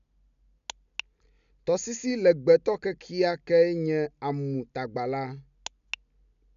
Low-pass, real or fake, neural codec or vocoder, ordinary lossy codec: 7.2 kHz; real; none; none